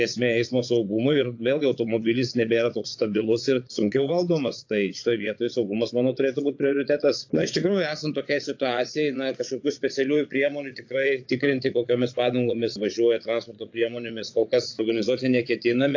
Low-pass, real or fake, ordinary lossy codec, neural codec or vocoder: 7.2 kHz; fake; AAC, 48 kbps; vocoder, 22.05 kHz, 80 mel bands, Vocos